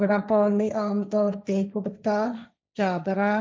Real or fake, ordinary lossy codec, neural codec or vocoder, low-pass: fake; none; codec, 16 kHz, 1.1 kbps, Voila-Tokenizer; none